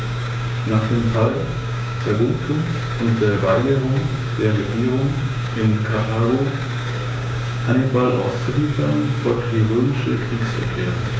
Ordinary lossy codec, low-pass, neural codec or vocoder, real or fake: none; none; codec, 16 kHz, 6 kbps, DAC; fake